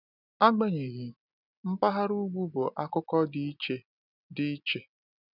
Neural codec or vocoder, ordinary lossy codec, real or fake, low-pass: none; none; real; 5.4 kHz